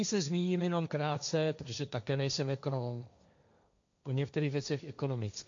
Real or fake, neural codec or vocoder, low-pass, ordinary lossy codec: fake; codec, 16 kHz, 1.1 kbps, Voila-Tokenizer; 7.2 kHz; AAC, 64 kbps